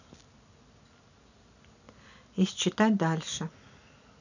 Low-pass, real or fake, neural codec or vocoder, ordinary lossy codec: 7.2 kHz; real; none; none